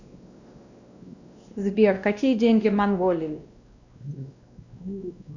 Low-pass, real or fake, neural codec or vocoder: 7.2 kHz; fake; codec, 16 kHz, 1 kbps, X-Codec, WavLM features, trained on Multilingual LibriSpeech